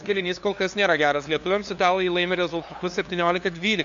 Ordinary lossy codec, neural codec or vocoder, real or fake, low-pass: MP3, 64 kbps; codec, 16 kHz, 2 kbps, FunCodec, trained on LibriTTS, 25 frames a second; fake; 7.2 kHz